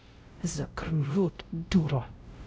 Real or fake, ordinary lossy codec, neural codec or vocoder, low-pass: fake; none; codec, 16 kHz, 0.5 kbps, X-Codec, WavLM features, trained on Multilingual LibriSpeech; none